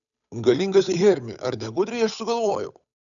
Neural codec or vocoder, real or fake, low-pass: codec, 16 kHz, 8 kbps, FunCodec, trained on Chinese and English, 25 frames a second; fake; 7.2 kHz